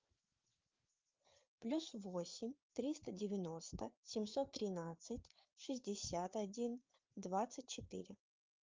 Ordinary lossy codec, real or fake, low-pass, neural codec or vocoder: Opus, 24 kbps; fake; 7.2 kHz; codec, 16 kHz, 4 kbps, FreqCodec, larger model